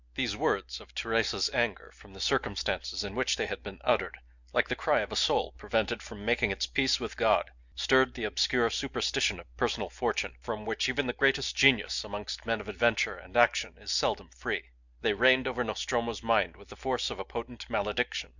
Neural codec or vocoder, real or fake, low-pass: none; real; 7.2 kHz